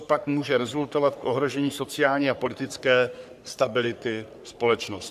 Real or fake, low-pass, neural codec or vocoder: fake; 14.4 kHz; codec, 44.1 kHz, 3.4 kbps, Pupu-Codec